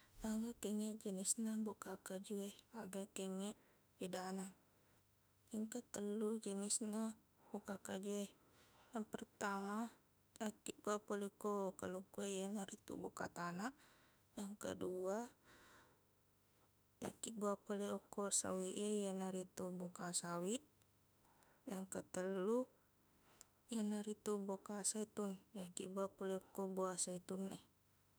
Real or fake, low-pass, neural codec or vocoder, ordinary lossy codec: fake; none; autoencoder, 48 kHz, 32 numbers a frame, DAC-VAE, trained on Japanese speech; none